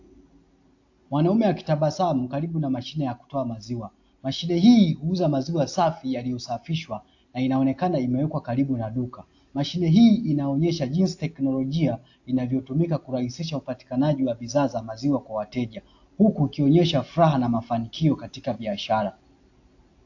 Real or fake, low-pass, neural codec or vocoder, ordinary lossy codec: real; 7.2 kHz; none; AAC, 48 kbps